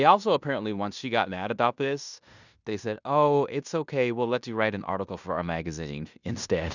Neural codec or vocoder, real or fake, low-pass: codec, 16 kHz in and 24 kHz out, 0.9 kbps, LongCat-Audio-Codec, fine tuned four codebook decoder; fake; 7.2 kHz